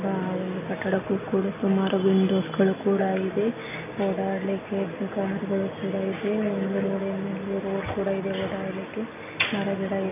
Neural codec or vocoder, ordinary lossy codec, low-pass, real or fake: none; none; 3.6 kHz; real